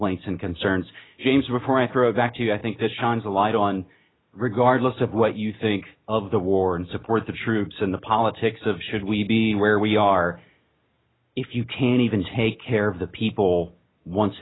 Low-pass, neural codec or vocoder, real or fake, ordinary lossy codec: 7.2 kHz; none; real; AAC, 16 kbps